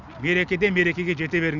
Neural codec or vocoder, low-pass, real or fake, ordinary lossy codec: none; 7.2 kHz; real; none